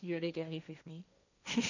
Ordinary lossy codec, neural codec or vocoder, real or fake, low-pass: none; codec, 16 kHz, 1.1 kbps, Voila-Tokenizer; fake; 7.2 kHz